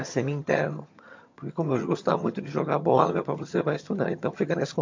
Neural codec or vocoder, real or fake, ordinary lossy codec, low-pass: vocoder, 22.05 kHz, 80 mel bands, HiFi-GAN; fake; MP3, 48 kbps; 7.2 kHz